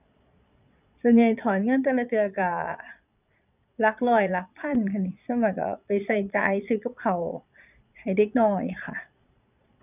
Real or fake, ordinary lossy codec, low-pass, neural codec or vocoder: fake; none; 3.6 kHz; vocoder, 22.05 kHz, 80 mel bands, Vocos